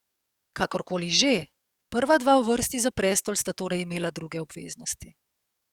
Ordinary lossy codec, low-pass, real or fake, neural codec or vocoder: Opus, 64 kbps; 19.8 kHz; fake; codec, 44.1 kHz, 7.8 kbps, DAC